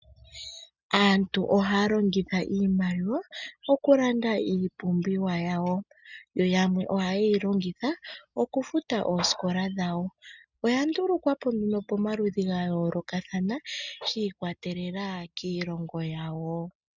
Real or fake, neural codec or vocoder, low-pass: real; none; 7.2 kHz